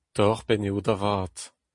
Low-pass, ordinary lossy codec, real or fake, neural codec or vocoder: 10.8 kHz; MP3, 48 kbps; real; none